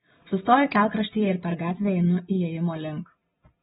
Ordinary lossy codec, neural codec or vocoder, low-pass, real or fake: AAC, 16 kbps; none; 7.2 kHz; real